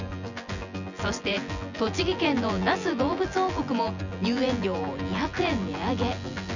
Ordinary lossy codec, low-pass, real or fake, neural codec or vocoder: none; 7.2 kHz; fake; vocoder, 24 kHz, 100 mel bands, Vocos